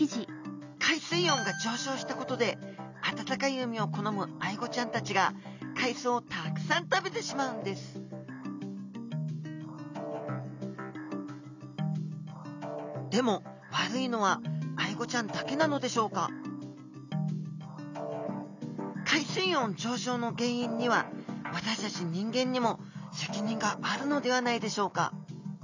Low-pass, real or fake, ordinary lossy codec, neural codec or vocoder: 7.2 kHz; real; none; none